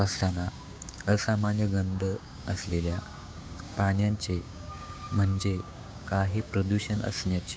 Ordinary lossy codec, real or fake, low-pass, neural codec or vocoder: none; fake; none; codec, 16 kHz, 6 kbps, DAC